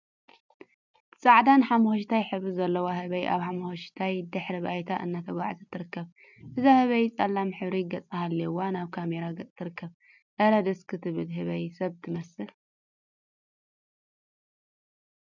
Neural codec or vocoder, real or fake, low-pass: none; real; 7.2 kHz